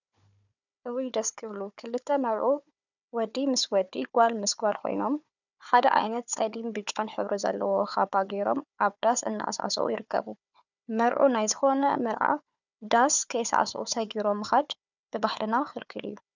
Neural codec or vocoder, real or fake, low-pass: codec, 16 kHz, 4 kbps, FunCodec, trained on Chinese and English, 50 frames a second; fake; 7.2 kHz